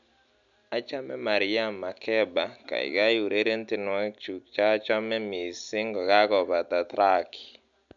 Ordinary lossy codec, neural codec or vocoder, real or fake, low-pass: none; none; real; 7.2 kHz